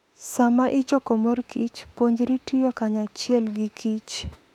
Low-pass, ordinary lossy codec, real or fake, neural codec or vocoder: 19.8 kHz; none; fake; autoencoder, 48 kHz, 32 numbers a frame, DAC-VAE, trained on Japanese speech